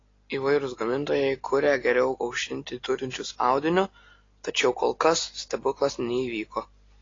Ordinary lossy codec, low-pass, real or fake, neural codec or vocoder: AAC, 32 kbps; 7.2 kHz; real; none